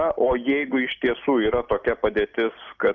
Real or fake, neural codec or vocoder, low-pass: real; none; 7.2 kHz